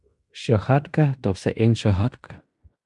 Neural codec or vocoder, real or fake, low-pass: codec, 16 kHz in and 24 kHz out, 0.9 kbps, LongCat-Audio-Codec, fine tuned four codebook decoder; fake; 10.8 kHz